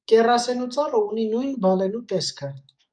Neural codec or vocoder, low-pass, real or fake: codec, 44.1 kHz, 7.8 kbps, DAC; 9.9 kHz; fake